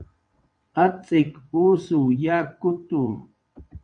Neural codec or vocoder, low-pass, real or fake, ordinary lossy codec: vocoder, 22.05 kHz, 80 mel bands, WaveNeXt; 9.9 kHz; fake; MP3, 64 kbps